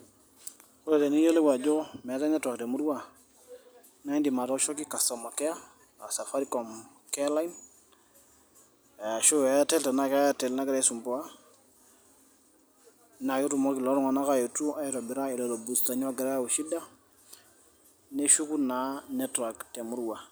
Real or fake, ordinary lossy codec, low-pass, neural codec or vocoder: real; none; none; none